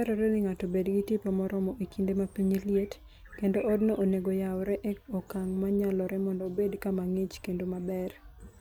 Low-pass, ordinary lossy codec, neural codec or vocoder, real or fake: none; none; none; real